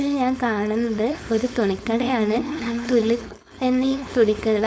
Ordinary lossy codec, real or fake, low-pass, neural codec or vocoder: none; fake; none; codec, 16 kHz, 4.8 kbps, FACodec